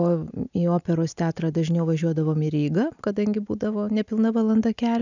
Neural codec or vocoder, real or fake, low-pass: none; real; 7.2 kHz